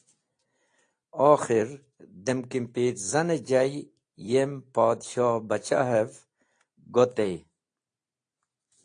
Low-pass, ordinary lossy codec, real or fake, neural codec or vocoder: 9.9 kHz; AAC, 48 kbps; real; none